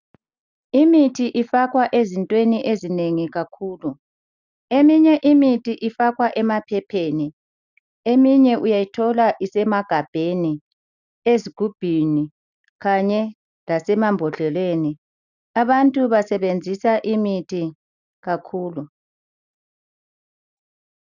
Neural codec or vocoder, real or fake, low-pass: none; real; 7.2 kHz